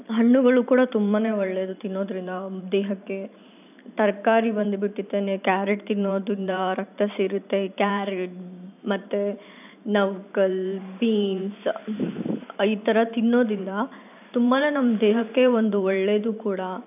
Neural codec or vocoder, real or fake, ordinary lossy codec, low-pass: vocoder, 44.1 kHz, 128 mel bands every 512 samples, BigVGAN v2; fake; none; 3.6 kHz